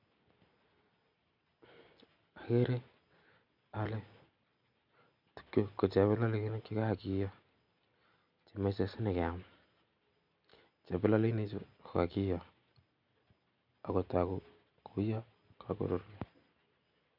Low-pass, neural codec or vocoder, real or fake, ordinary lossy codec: 5.4 kHz; none; real; none